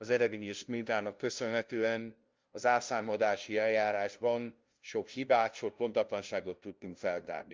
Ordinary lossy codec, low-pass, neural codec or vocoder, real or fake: Opus, 24 kbps; 7.2 kHz; codec, 16 kHz, 0.5 kbps, FunCodec, trained on LibriTTS, 25 frames a second; fake